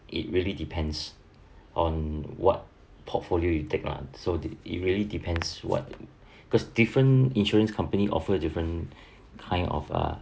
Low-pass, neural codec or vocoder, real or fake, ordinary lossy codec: none; none; real; none